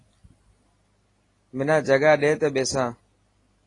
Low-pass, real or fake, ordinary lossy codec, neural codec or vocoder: 10.8 kHz; real; AAC, 32 kbps; none